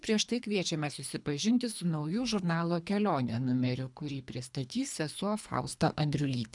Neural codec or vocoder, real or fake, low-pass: codec, 24 kHz, 3 kbps, HILCodec; fake; 10.8 kHz